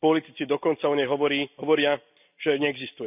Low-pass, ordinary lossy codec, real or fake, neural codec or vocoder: 3.6 kHz; none; real; none